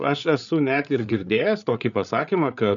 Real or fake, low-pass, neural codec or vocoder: fake; 7.2 kHz; codec, 16 kHz, 8 kbps, FreqCodec, larger model